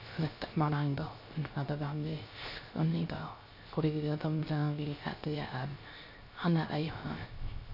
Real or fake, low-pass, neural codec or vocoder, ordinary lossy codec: fake; 5.4 kHz; codec, 16 kHz, 0.3 kbps, FocalCodec; none